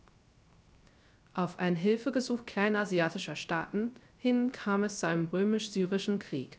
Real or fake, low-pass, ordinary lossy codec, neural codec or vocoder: fake; none; none; codec, 16 kHz, 0.3 kbps, FocalCodec